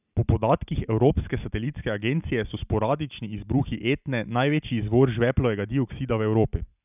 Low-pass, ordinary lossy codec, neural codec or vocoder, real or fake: 3.6 kHz; none; none; real